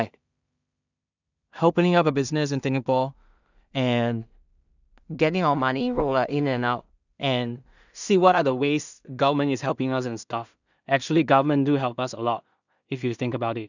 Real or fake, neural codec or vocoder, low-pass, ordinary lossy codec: fake; codec, 16 kHz in and 24 kHz out, 0.4 kbps, LongCat-Audio-Codec, two codebook decoder; 7.2 kHz; none